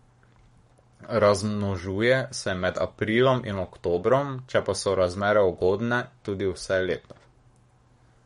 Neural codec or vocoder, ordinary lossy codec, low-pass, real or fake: codec, 44.1 kHz, 7.8 kbps, Pupu-Codec; MP3, 48 kbps; 19.8 kHz; fake